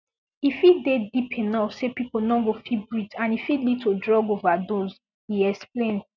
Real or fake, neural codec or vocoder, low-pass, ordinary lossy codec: real; none; 7.2 kHz; none